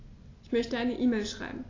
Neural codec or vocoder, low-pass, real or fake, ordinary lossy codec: none; 7.2 kHz; real; AAC, 32 kbps